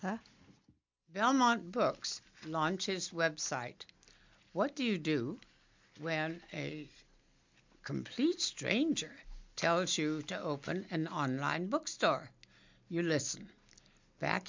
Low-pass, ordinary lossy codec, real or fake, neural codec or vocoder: 7.2 kHz; MP3, 64 kbps; real; none